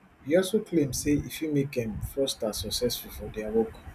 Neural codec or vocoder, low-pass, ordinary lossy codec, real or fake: none; 14.4 kHz; none; real